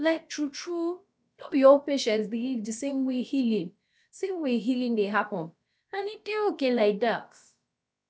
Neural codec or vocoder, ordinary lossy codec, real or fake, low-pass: codec, 16 kHz, about 1 kbps, DyCAST, with the encoder's durations; none; fake; none